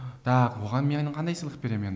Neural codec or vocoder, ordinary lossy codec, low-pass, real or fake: none; none; none; real